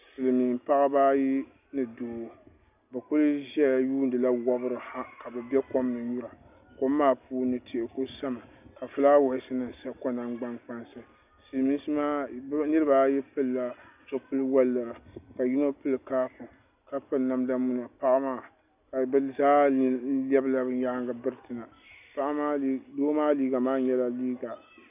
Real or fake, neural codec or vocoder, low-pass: real; none; 3.6 kHz